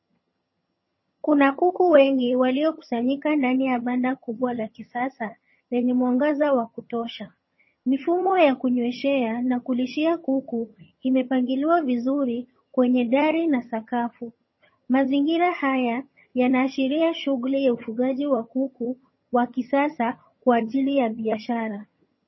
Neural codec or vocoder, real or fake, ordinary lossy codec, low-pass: vocoder, 22.05 kHz, 80 mel bands, HiFi-GAN; fake; MP3, 24 kbps; 7.2 kHz